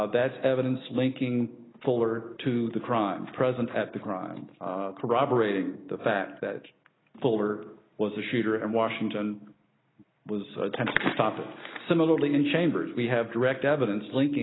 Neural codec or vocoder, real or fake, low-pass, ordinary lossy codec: none; real; 7.2 kHz; AAC, 16 kbps